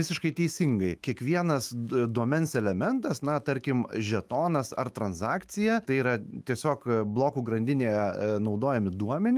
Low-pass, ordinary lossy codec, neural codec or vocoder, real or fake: 14.4 kHz; Opus, 32 kbps; autoencoder, 48 kHz, 128 numbers a frame, DAC-VAE, trained on Japanese speech; fake